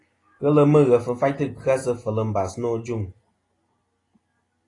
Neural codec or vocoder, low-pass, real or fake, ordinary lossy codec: none; 10.8 kHz; real; AAC, 32 kbps